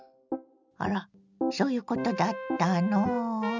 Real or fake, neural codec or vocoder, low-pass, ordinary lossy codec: real; none; 7.2 kHz; none